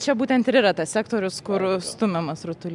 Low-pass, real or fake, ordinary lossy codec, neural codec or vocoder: 10.8 kHz; real; MP3, 96 kbps; none